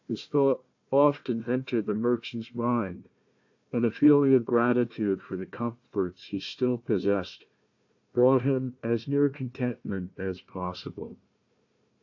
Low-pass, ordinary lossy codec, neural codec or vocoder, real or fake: 7.2 kHz; AAC, 48 kbps; codec, 16 kHz, 1 kbps, FunCodec, trained on Chinese and English, 50 frames a second; fake